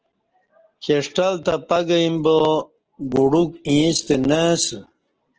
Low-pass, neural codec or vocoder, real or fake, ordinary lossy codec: 7.2 kHz; none; real; Opus, 16 kbps